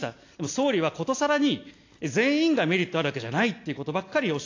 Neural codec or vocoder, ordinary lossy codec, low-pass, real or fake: none; AAC, 48 kbps; 7.2 kHz; real